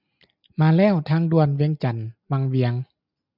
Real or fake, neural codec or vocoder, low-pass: real; none; 5.4 kHz